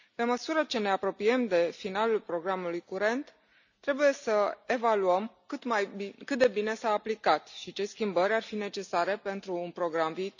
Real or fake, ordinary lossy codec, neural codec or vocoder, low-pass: real; none; none; 7.2 kHz